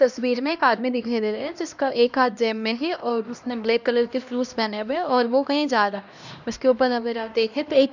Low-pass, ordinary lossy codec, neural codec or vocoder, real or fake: 7.2 kHz; none; codec, 16 kHz, 1 kbps, X-Codec, HuBERT features, trained on LibriSpeech; fake